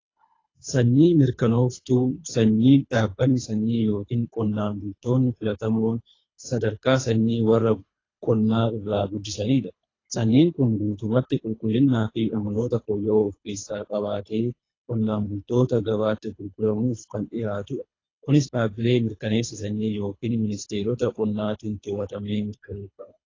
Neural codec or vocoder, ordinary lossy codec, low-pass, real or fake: codec, 24 kHz, 3 kbps, HILCodec; AAC, 32 kbps; 7.2 kHz; fake